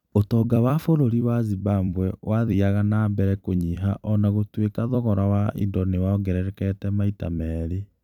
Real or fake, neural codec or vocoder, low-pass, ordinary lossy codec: fake; vocoder, 44.1 kHz, 128 mel bands every 256 samples, BigVGAN v2; 19.8 kHz; none